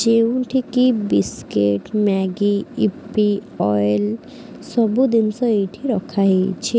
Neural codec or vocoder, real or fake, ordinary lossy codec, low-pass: none; real; none; none